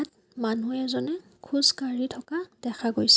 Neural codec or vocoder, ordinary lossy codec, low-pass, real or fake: none; none; none; real